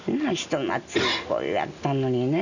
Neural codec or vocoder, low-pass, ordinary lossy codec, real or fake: none; 7.2 kHz; none; real